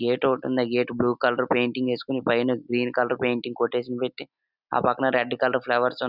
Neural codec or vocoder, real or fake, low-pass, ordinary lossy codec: none; real; 5.4 kHz; none